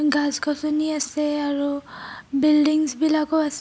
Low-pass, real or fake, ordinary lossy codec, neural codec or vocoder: none; real; none; none